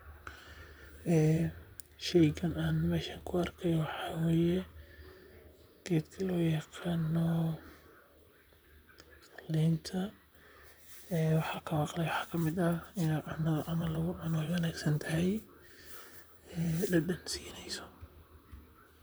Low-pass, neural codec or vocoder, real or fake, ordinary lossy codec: none; vocoder, 44.1 kHz, 128 mel bands, Pupu-Vocoder; fake; none